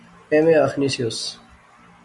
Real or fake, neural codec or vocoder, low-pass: real; none; 10.8 kHz